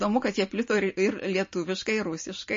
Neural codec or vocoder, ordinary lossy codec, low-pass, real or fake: none; MP3, 32 kbps; 7.2 kHz; real